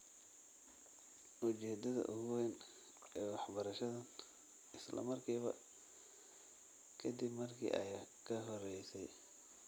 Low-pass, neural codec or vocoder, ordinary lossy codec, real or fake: none; none; none; real